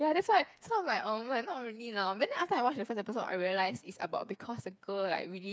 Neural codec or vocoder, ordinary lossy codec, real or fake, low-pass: codec, 16 kHz, 4 kbps, FreqCodec, smaller model; none; fake; none